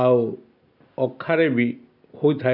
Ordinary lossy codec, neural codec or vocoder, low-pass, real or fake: MP3, 48 kbps; none; 5.4 kHz; real